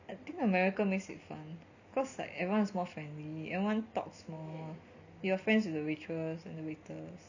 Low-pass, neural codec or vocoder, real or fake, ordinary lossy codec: 7.2 kHz; none; real; none